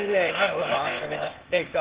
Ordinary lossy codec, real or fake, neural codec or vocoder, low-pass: Opus, 16 kbps; fake; codec, 16 kHz, 0.8 kbps, ZipCodec; 3.6 kHz